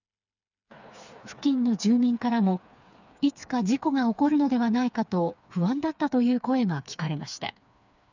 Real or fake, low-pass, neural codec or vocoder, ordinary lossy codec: fake; 7.2 kHz; codec, 16 kHz, 4 kbps, FreqCodec, smaller model; none